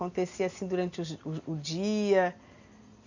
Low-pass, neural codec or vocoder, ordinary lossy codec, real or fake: 7.2 kHz; none; none; real